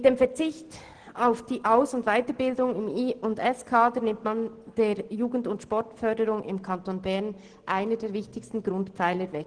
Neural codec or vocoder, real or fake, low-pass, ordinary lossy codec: none; real; 9.9 kHz; Opus, 16 kbps